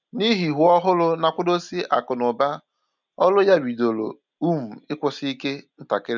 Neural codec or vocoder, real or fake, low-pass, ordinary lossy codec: none; real; 7.2 kHz; none